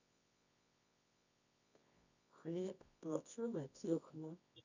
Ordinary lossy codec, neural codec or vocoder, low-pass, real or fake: none; codec, 24 kHz, 0.9 kbps, WavTokenizer, medium music audio release; 7.2 kHz; fake